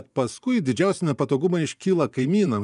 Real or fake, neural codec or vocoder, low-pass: fake; vocoder, 24 kHz, 100 mel bands, Vocos; 10.8 kHz